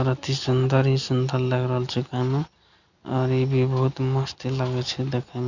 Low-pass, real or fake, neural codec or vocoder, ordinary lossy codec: 7.2 kHz; real; none; none